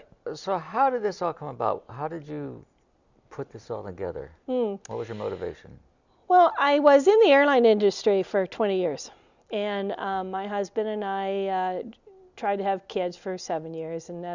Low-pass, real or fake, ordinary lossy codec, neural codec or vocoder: 7.2 kHz; real; Opus, 64 kbps; none